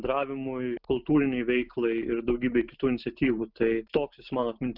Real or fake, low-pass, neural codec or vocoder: real; 5.4 kHz; none